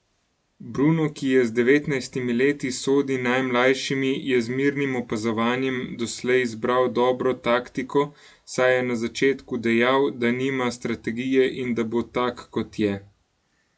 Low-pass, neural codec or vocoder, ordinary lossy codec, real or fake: none; none; none; real